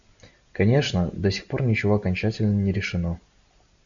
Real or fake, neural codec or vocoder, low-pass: real; none; 7.2 kHz